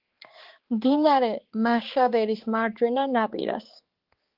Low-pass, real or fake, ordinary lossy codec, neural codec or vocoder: 5.4 kHz; fake; Opus, 16 kbps; codec, 16 kHz, 4 kbps, X-Codec, HuBERT features, trained on balanced general audio